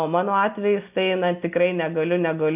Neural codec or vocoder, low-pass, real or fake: none; 3.6 kHz; real